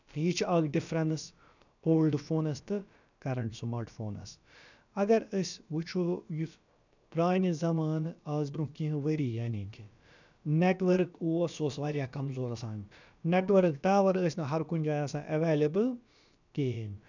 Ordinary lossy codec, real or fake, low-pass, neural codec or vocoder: none; fake; 7.2 kHz; codec, 16 kHz, about 1 kbps, DyCAST, with the encoder's durations